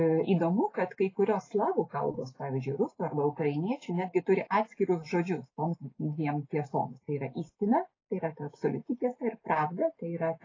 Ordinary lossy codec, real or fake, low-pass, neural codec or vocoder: AAC, 32 kbps; real; 7.2 kHz; none